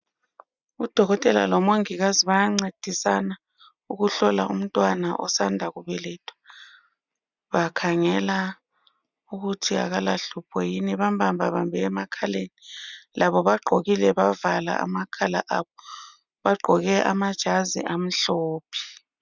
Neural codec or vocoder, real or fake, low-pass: none; real; 7.2 kHz